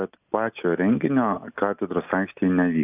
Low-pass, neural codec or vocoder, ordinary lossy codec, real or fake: 3.6 kHz; none; AAC, 32 kbps; real